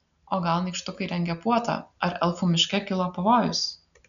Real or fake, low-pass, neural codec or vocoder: real; 7.2 kHz; none